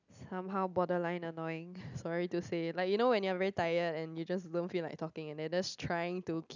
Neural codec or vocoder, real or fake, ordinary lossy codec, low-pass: none; real; none; 7.2 kHz